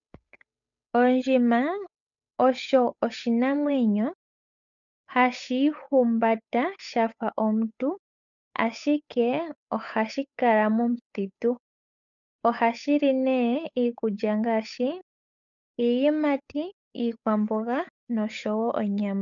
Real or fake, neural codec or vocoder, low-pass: fake; codec, 16 kHz, 8 kbps, FunCodec, trained on Chinese and English, 25 frames a second; 7.2 kHz